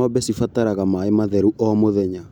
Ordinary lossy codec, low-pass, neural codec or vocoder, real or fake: none; 19.8 kHz; none; real